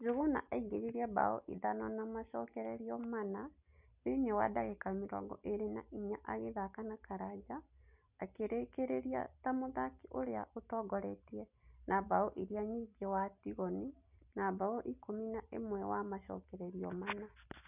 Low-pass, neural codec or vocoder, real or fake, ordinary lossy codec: 3.6 kHz; none; real; none